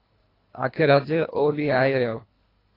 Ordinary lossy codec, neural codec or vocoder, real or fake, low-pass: AAC, 24 kbps; codec, 24 kHz, 1.5 kbps, HILCodec; fake; 5.4 kHz